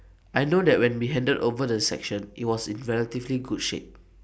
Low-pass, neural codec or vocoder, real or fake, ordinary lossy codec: none; none; real; none